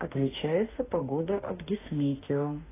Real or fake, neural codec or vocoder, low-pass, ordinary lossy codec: fake; autoencoder, 48 kHz, 32 numbers a frame, DAC-VAE, trained on Japanese speech; 3.6 kHz; AAC, 16 kbps